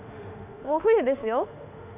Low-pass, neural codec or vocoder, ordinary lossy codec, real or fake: 3.6 kHz; autoencoder, 48 kHz, 32 numbers a frame, DAC-VAE, trained on Japanese speech; none; fake